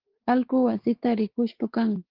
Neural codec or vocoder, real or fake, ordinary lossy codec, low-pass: codec, 16 kHz, 4 kbps, X-Codec, WavLM features, trained on Multilingual LibriSpeech; fake; Opus, 16 kbps; 5.4 kHz